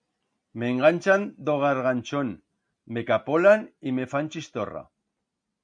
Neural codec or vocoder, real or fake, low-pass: none; real; 9.9 kHz